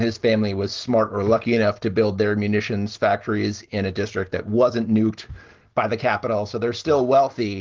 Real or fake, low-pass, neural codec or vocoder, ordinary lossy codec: real; 7.2 kHz; none; Opus, 16 kbps